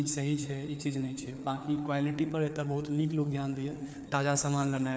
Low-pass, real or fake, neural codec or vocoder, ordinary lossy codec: none; fake; codec, 16 kHz, 4 kbps, FreqCodec, larger model; none